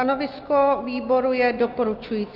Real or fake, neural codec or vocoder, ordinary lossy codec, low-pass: real; none; Opus, 32 kbps; 5.4 kHz